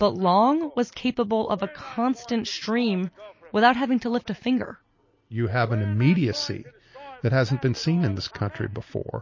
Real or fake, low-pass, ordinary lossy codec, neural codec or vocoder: real; 7.2 kHz; MP3, 32 kbps; none